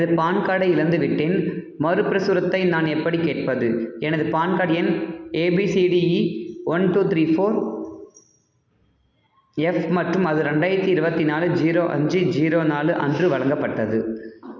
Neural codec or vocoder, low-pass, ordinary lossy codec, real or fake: none; 7.2 kHz; none; real